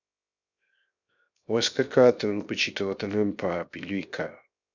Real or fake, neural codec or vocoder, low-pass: fake; codec, 16 kHz, 0.7 kbps, FocalCodec; 7.2 kHz